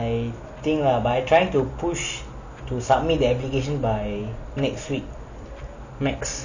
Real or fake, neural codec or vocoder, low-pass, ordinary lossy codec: real; none; 7.2 kHz; none